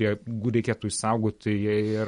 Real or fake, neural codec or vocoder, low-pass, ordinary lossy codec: real; none; 19.8 kHz; MP3, 48 kbps